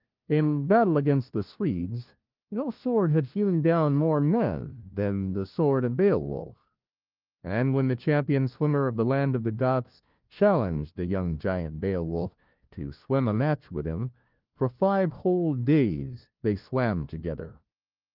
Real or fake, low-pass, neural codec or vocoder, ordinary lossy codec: fake; 5.4 kHz; codec, 16 kHz, 1 kbps, FunCodec, trained on LibriTTS, 50 frames a second; Opus, 32 kbps